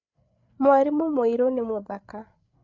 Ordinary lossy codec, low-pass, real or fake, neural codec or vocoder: none; 7.2 kHz; fake; codec, 16 kHz, 8 kbps, FreqCodec, larger model